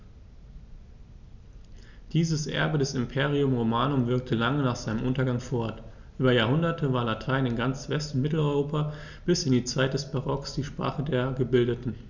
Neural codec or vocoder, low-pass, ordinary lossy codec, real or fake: none; 7.2 kHz; none; real